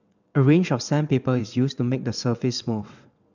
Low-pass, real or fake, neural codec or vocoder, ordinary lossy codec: 7.2 kHz; fake; vocoder, 22.05 kHz, 80 mel bands, WaveNeXt; none